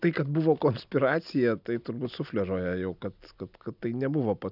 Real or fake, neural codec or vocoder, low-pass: real; none; 5.4 kHz